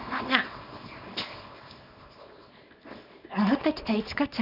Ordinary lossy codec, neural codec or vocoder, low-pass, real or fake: none; codec, 24 kHz, 0.9 kbps, WavTokenizer, small release; 5.4 kHz; fake